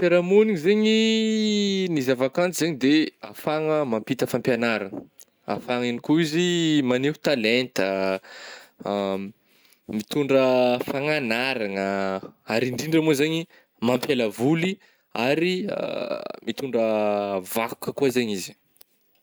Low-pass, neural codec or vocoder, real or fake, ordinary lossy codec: none; none; real; none